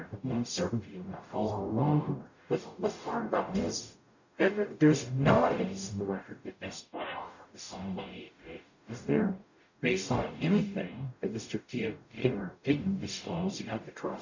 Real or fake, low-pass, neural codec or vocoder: fake; 7.2 kHz; codec, 44.1 kHz, 0.9 kbps, DAC